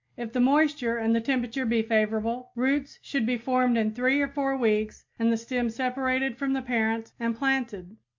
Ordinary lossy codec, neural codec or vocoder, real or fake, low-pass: MP3, 48 kbps; none; real; 7.2 kHz